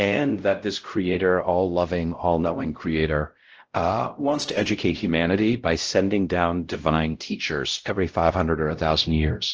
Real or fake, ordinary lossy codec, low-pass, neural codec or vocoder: fake; Opus, 16 kbps; 7.2 kHz; codec, 16 kHz, 0.5 kbps, X-Codec, WavLM features, trained on Multilingual LibriSpeech